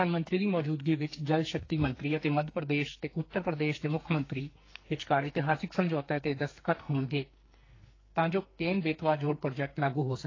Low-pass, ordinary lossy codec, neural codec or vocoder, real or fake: 7.2 kHz; AAC, 32 kbps; codec, 44.1 kHz, 2.6 kbps, SNAC; fake